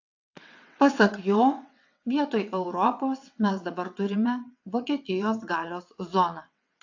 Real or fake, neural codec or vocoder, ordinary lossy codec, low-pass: fake; vocoder, 22.05 kHz, 80 mel bands, WaveNeXt; AAC, 48 kbps; 7.2 kHz